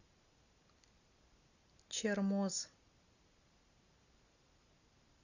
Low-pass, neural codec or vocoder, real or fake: 7.2 kHz; none; real